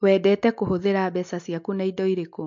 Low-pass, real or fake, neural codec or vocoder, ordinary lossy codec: 7.2 kHz; real; none; MP3, 48 kbps